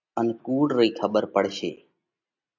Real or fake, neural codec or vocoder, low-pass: real; none; 7.2 kHz